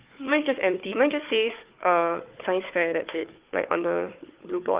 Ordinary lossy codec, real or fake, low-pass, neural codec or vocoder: Opus, 32 kbps; fake; 3.6 kHz; codec, 16 kHz, 4 kbps, FunCodec, trained on Chinese and English, 50 frames a second